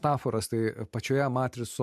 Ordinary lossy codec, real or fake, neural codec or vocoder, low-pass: MP3, 64 kbps; real; none; 14.4 kHz